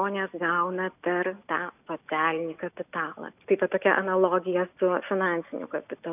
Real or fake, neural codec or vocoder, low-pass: real; none; 3.6 kHz